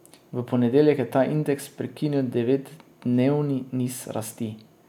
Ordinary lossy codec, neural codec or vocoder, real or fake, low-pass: none; none; real; 19.8 kHz